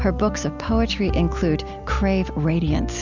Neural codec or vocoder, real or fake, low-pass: none; real; 7.2 kHz